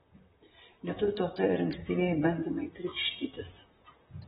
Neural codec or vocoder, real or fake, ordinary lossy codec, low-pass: vocoder, 44.1 kHz, 128 mel bands, Pupu-Vocoder; fake; AAC, 16 kbps; 19.8 kHz